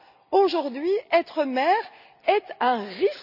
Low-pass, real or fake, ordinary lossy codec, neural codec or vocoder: 5.4 kHz; real; none; none